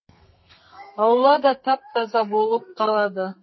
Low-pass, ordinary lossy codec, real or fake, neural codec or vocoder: 7.2 kHz; MP3, 24 kbps; fake; codec, 32 kHz, 1.9 kbps, SNAC